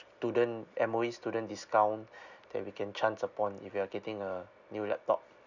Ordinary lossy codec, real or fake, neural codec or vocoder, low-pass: none; real; none; 7.2 kHz